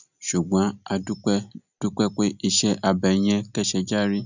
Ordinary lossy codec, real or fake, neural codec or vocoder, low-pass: none; real; none; 7.2 kHz